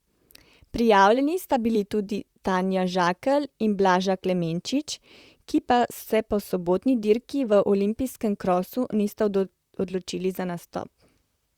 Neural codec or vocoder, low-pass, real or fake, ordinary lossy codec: vocoder, 44.1 kHz, 128 mel bands, Pupu-Vocoder; 19.8 kHz; fake; Opus, 64 kbps